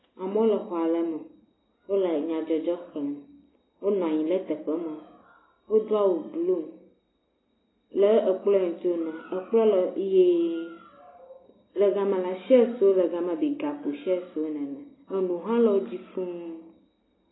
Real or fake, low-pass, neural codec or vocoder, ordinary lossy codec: real; 7.2 kHz; none; AAC, 16 kbps